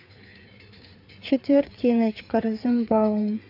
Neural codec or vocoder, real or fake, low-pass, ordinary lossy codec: codec, 16 kHz, 4 kbps, FreqCodec, larger model; fake; 5.4 kHz; AAC, 48 kbps